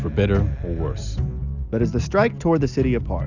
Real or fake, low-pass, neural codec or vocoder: real; 7.2 kHz; none